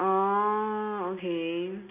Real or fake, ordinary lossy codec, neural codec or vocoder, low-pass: real; AAC, 32 kbps; none; 3.6 kHz